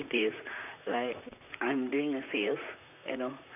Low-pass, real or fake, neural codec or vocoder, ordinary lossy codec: 3.6 kHz; fake; vocoder, 44.1 kHz, 128 mel bands, Pupu-Vocoder; none